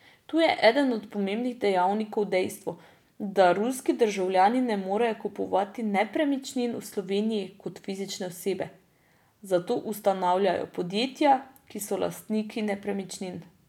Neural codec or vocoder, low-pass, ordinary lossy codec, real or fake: none; 19.8 kHz; none; real